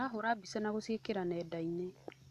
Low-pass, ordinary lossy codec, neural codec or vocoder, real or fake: 14.4 kHz; none; none; real